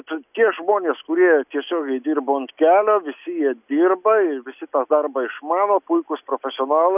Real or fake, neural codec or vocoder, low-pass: real; none; 3.6 kHz